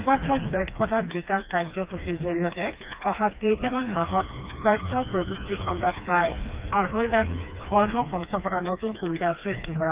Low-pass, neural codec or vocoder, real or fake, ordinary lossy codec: 3.6 kHz; codec, 16 kHz, 2 kbps, FreqCodec, smaller model; fake; Opus, 32 kbps